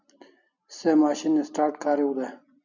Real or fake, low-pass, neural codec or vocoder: real; 7.2 kHz; none